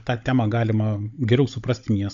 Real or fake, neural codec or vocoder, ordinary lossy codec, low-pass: fake; codec, 16 kHz, 16 kbps, FunCodec, trained on Chinese and English, 50 frames a second; AAC, 64 kbps; 7.2 kHz